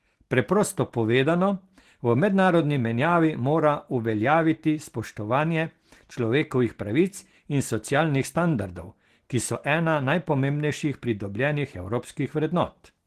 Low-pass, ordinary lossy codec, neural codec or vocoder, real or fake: 14.4 kHz; Opus, 16 kbps; none; real